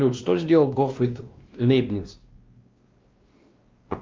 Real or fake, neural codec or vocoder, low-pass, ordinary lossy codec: fake; codec, 16 kHz, 1 kbps, X-Codec, WavLM features, trained on Multilingual LibriSpeech; 7.2 kHz; Opus, 24 kbps